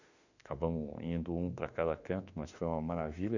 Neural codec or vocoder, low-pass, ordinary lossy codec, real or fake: autoencoder, 48 kHz, 32 numbers a frame, DAC-VAE, trained on Japanese speech; 7.2 kHz; none; fake